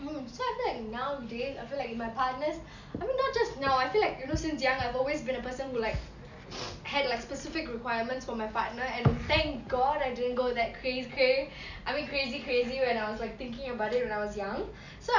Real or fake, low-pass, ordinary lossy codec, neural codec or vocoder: real; 7.2 kHz; none; none